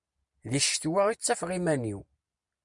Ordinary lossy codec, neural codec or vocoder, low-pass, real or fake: MP3, 96 kbps; none; 10.8 kHz; real